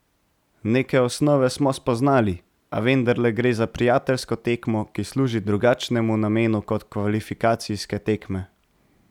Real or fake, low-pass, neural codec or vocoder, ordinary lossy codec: real; 19.8 kHz; none; none